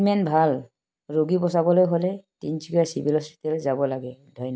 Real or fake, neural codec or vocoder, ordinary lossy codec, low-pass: real; none; none; none